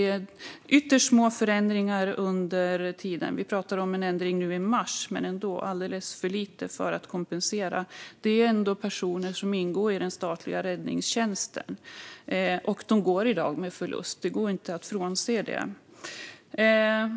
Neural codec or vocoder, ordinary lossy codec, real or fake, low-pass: none; none; real; none